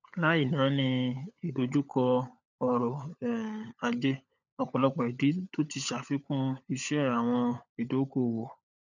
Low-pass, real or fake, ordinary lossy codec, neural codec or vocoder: 7.2 kHz; fake; none; codec, 16 kHz, 16 kbps, FunCodec, trained on LibriTTS, 50 frames a second